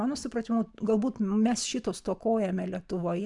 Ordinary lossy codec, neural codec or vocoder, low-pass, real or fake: MP3, 96 kbps; none; 10.8 kHz; real